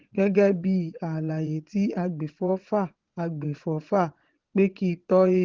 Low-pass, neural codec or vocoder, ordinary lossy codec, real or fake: 7.2 kHz; vocoder, 22.05 kHz, 80 mel bands, Vocos; Opus, 16 kbps; fake